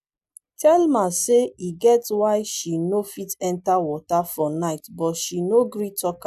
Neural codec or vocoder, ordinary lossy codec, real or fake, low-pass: none; none; real; 14.4 kHz